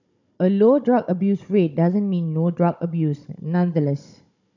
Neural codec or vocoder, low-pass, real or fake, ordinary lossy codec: codec, 16 kHz, 16 kbps, FunCodec, trained on Chinese and English, 50 frames a second; 7.2 kHz; fake; none